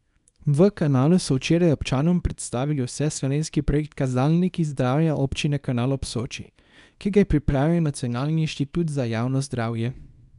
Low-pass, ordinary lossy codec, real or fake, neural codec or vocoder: 10.8 kHz; none; fake; codec, 24 kHz, 0.9 kbps, WavTokenizer, small release